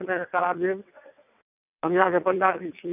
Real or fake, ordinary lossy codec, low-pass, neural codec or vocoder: fake; none; 3.6 kHz; vocoder, 22.05 kHz, 80 mel bands, WaveNeXt